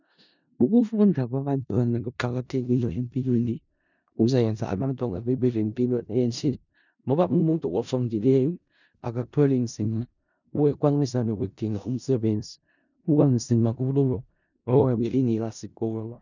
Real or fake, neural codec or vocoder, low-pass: fake; codec, 16 kHz in and 24 kHz out, 0.4 kbps, LongCat-Audio-Codec, four codebook decoder; 7.2 kHz